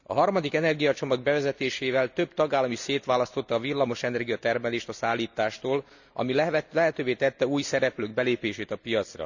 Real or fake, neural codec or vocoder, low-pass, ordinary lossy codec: real; none; 7.2 kHz; none